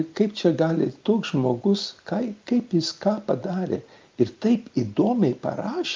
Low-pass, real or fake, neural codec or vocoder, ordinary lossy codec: 7.2 kHz; real; none; Opus, 24 kbps